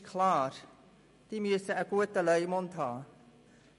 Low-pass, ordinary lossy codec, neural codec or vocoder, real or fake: 14.4 kHz; MP3, 48 kbps; none; real